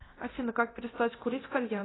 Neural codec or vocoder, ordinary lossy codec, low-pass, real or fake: codec, 24 kHz, 0.9 kbps, DualCodec; AAC, 16 kbps; 7.2 kHz; fake